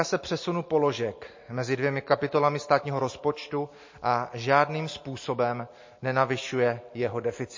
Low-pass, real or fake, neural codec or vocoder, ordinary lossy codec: 7.2 kHz; real; none; MP3, 32 kbps